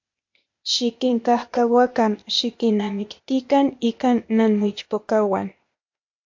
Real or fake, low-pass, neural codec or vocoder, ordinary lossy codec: fake; 7.2 kHz; codec, 16 kHz, 0.8 kbps, ZipCodec; MP3, 48 kbps